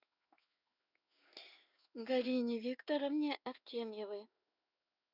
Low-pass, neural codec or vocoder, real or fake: 5.4 kHz; codec, 16 kHz in and 24 kHz out, 1 kbps, XY-Tokenizer; fake